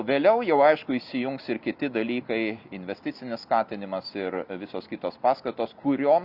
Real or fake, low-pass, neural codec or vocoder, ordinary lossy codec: real; 5.4 kHz; none; Opus, 64 kbps